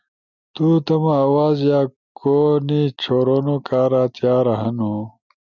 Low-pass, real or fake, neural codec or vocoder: 7.2 kHz; real; none